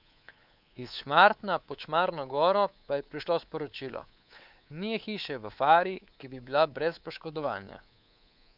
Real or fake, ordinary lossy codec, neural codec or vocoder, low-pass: fake; none; codec, 24 kHz, 3.1 kbps, DualCodec; 5.4 kHz